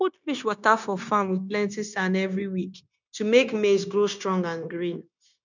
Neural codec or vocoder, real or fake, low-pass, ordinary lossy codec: codec, 16 kHz, 0.9 kbps, LongCat-Audio-Codec; fake; 7.2 kHz; none